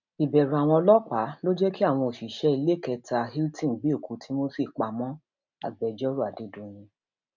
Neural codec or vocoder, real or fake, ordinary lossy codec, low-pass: none; real; none; 7.2 kHz